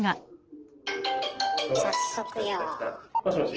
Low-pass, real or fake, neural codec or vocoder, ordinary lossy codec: 7.2 kHz; real; none; Opus, 16 kbps